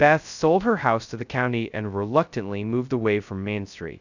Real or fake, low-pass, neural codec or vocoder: fake; 7.2 kHz; codec, 16 kHz, 0.2 kbps, FocalCodec